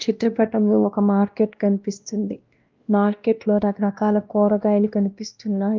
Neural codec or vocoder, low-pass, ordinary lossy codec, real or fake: codec, 16 kHz, 1 kbps, X-Codec, WavLM features, trained on Multilingual LibriSpeech; 7.2 kHz; Opus, 24 kbps; fake